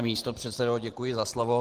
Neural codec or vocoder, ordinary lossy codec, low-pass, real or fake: none; Opus, 16 kbps; 14.4 kHz; real